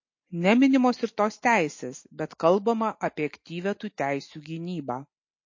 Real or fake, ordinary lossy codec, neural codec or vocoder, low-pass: real; MP3, 32 kbps; none; 7.2 kHz